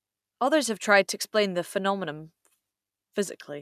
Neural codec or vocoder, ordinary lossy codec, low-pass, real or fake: none; none; 14.4 kHz; real